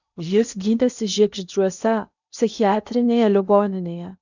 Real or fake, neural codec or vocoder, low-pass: fake; codec, 16 kHz in and 24 kHz out, 0.8 kbps, FocalCodec, streaming, 65536 codes; 7.2 kHz